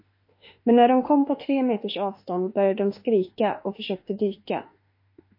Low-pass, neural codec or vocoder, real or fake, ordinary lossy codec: 5.4 kHz; autoencoder, 48 kHz, 32 numbers a frame, DAC-VAE, trained on Japanese speech; fake; MP3, 32 kbps